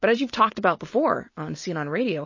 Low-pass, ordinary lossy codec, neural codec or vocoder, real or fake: 7.2 kHz; MP3, 32 kbps; none; real